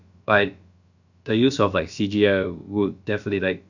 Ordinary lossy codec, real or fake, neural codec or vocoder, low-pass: none; fake; codec, 16 kHz, about 1 kbps, DyCAST, with the encoder's durations; 7.2 kHz